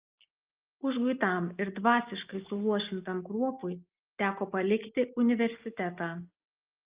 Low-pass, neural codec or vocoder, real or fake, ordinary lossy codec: 3.6 kHz; none; real; Opus, 24 kbps